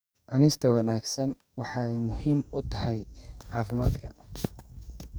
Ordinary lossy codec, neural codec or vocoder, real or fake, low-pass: none; codec, 44.1 kHz, 2.6 kbps, DAC; fake; none